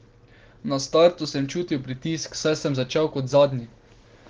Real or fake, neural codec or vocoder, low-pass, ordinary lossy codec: real; none; 7.2 kHz; Opus, 16 kbps